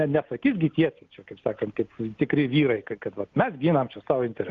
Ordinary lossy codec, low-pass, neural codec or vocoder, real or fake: Opus, 32 kbps; 7.2 kHz; none; real